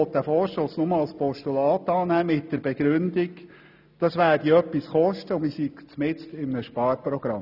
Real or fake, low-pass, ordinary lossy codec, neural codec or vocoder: real; 5.4 kHz; none; none